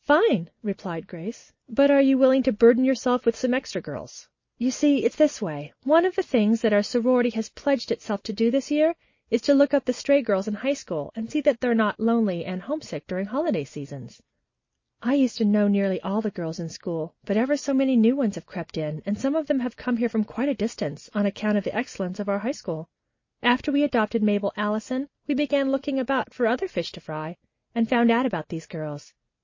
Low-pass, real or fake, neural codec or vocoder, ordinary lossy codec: 7.2 kHz; real; none; MP3, 32 kbps